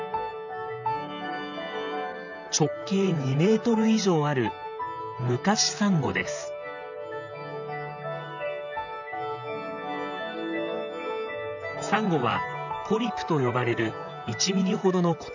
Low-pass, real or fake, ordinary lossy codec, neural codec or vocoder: 7.2 kHz; fake; none; vocoder, 44.1 kHz, 128 mel bands, Pupu-Vocoder